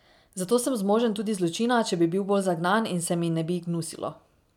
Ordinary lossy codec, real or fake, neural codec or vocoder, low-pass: none; real; none; 19.8 kHz